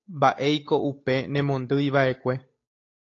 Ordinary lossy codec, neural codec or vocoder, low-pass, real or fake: AAC, 32 kbps; codec, 16 kHz, 8 kbps, FunCodec, trained on Chinese and English, 25 frames a second; 7.2 kHz; fake